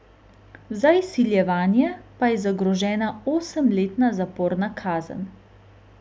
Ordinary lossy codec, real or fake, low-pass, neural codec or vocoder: none; real; none; none